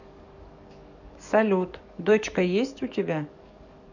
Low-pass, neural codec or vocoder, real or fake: 7.2 kHz; codec, 44.1 kHz, 7.8 kbps, DAC; fake